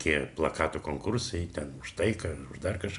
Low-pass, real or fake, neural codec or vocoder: 10.8 kHz; real; none